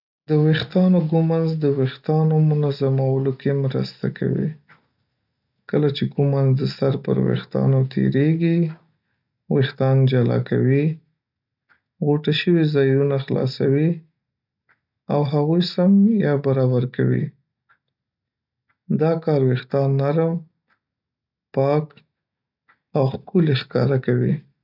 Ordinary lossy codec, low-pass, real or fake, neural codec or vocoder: none; 5.4 kHz; real; none